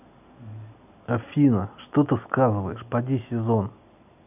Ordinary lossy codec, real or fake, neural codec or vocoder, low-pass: none; real; none; 3.6 kHz